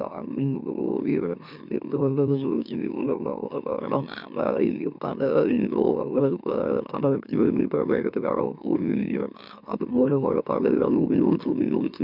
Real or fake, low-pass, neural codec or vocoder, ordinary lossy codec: fake; 5.4 kHz; autoencoder, 44.1 kHz, a latent of 192 numbers a frame, MeloTTS; none